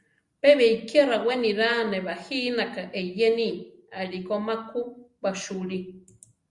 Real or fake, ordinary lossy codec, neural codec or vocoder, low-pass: real; Opus, 64 kbps; none; 10.8 kHz